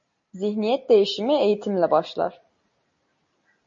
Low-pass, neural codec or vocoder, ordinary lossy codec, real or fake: 7.2 kHz; none; MP3, 32 kbps; real